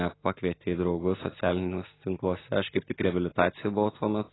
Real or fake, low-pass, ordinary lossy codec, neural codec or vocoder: real; 7.2 kHz; AAC, 16 kbps; none